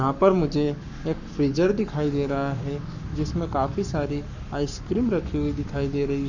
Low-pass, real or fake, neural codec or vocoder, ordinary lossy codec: 7.2 kHz; fake; codec, 44.1 kHz, 7.8 kbps, Pupu-Codec; none